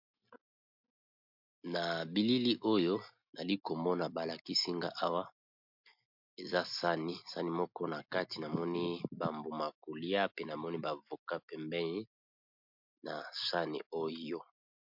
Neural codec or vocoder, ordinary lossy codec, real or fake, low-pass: none; MP3, 48 kbps; real; 5.4 kHz